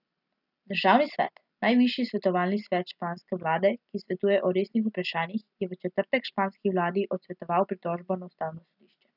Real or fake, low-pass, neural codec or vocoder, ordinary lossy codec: real; 5.4 kHz; none; none